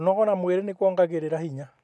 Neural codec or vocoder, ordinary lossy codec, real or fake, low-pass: none; none; real; none